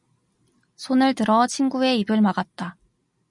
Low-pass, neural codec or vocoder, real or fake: 10.8 kHz; none; real